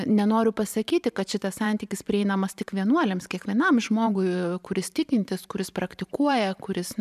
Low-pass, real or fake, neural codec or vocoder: 14.4 kHz; fake; vocoder, 44.1 kHz, 128 mel bands every 512 samples, BigVGAN v2